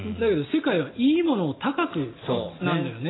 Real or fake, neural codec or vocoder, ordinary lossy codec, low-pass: real; none; AAC, 16 kbps; 7.2 kHz